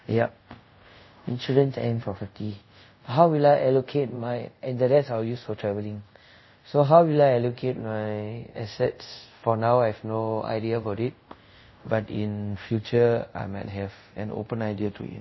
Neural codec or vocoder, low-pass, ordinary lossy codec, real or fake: codec, 24 kHz, 0.5 kbps, DualCodec; 7.2 kHz; MP3, 24 kbps; fake